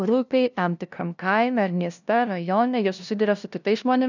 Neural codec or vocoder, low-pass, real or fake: codec, 16 kHz, 0.5 kbps, FunCodec, trained on LibriTTS, 25 frames a second; 7.2 kHz; fake